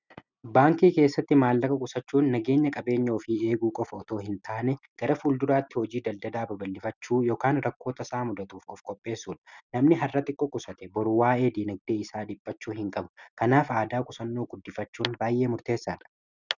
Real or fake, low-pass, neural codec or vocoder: real; 7.2 kHz; none